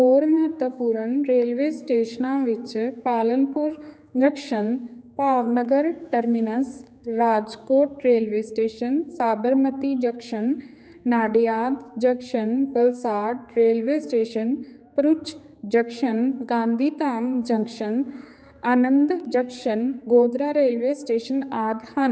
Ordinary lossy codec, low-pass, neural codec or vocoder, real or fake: none; none; codec, 16 kHz, 4 kbps, X-Codec, HuBERT features, trained on general audio; fake